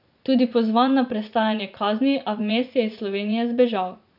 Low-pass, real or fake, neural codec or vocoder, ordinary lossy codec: 5.4 kHz; fake; vocoder, 44.1 kHz, 80 mel bands, Vocos; none